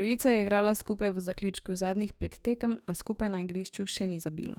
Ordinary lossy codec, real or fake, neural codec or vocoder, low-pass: none; fake; codec, 44.1 kHz, 2.6 kbps, DAC; 19.8 kHz